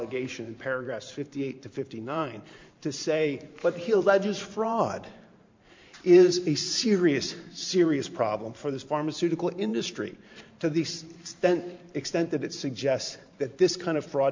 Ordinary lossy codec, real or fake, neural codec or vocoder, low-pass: MP3, 64 kbps; fake; vocoder, 44.1 kHz, 128 mel bands every 512 samples, BigVGAN v2; 7.2 kHz